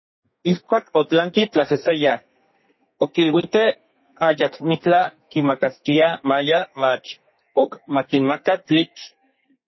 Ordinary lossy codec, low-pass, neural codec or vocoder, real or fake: MP3, 24 kbps; 7.2 kHz; codec, 32 kHz, 1.9 kbps, SNAC; fake